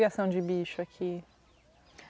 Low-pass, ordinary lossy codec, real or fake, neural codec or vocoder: none; none; real; none